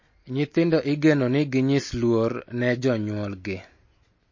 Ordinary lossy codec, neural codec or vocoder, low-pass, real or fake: MP3, 32 kbps; none; 7.2 kHz; real